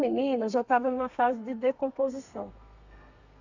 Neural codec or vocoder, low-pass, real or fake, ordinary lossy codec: codec, 32 kHz, 1.9 kbps, SNAC; 7.2 kHz; fake; none